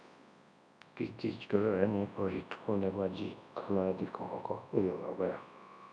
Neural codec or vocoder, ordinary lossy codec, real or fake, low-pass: codec, 24 kHz, 0.9 kbps, WavTokenizer, large speech release; none; fake; 9.9 kHz